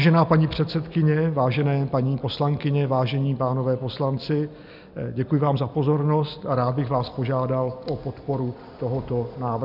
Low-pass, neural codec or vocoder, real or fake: 5.4 kHz; none; real